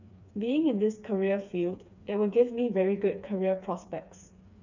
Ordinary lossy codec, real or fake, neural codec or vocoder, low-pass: none; fake; codec, 16 kHz, 4 kbps, FreqCodec, smaller model; 7.2 kHz